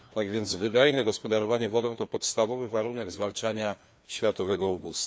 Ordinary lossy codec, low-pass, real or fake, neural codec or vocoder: none; none; fake; codec, 16 kHz, 2 kbps, FreqCodec, larger model